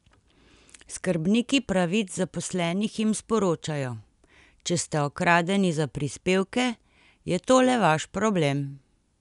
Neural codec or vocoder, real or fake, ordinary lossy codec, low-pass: none; real; none; 10.8 kHz